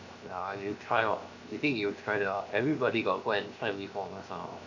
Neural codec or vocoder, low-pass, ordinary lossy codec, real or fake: codec, 16 kHz, 0.7 kbps, FocalCodec; 7.2 kHz; none; fake